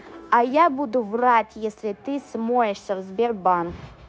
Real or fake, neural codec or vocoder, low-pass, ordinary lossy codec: fake; codec, 16 kHz, 0.9 kbps, LongCat-Audio-Codec; none; none